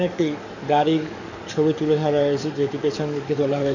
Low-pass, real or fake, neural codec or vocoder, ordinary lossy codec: 7.2 kHz; fake; codec, 44.1 kHz, 7.8 kbps, DAC; none